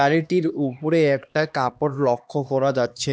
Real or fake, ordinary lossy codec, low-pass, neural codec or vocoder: fake; none; none; codec, 16 kHz, 2 kbps, X-Codec, HuBERT features, trained on LibriSpeech